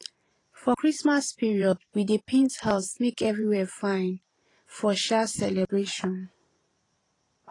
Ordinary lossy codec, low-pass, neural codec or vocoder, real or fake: AAC, 32 kbps; 10.8 kHz; vocoder, 44.1 kHz, 128 mel bands every 512 samples, BigVGAN v2; fake